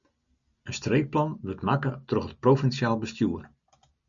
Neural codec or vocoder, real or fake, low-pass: none; real; 7.2 kHz